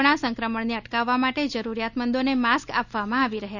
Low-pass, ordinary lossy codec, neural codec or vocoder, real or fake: 7.2 kHz; none; none; real